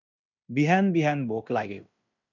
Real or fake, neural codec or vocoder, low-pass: fake; codec, 16 kHz in and 24 kHz out, 0.9 kbps, LongCat-Audio-Codec, fine tuned four codebook decoder; 7.2 kHz